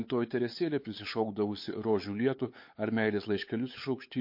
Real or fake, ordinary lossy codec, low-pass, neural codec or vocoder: fake; MP3, 32 kbps; 5.4 kHz; codec, 16 kHz, 8 kbps, FunCodec, trained on LibriTTS, 25 frames a second